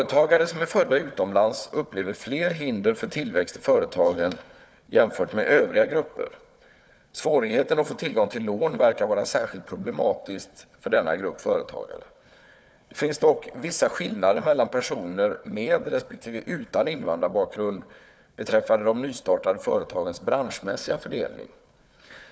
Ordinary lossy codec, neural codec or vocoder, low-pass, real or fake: none; codec, 16 kHz, 4 kbps, FunCodec, trained on Chinese and English, 50 frames a second; none; fake